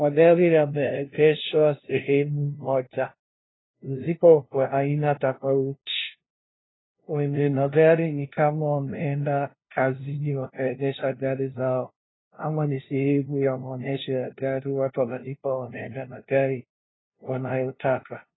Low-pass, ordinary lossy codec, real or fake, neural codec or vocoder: 7.2 kHz; AAC, 16 kbps; fake; codec, 16 kHz, 0.5 kbps, FunCodec, trained on LibriTTS, 25 frames a second